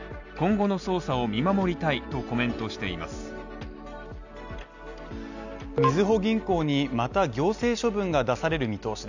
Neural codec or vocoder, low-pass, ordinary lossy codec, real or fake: none; 7.2 kHz; none; real